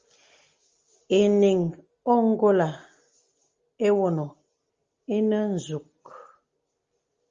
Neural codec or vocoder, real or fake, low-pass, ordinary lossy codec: none; real; 7.2 kHz; Opus, 32 kbps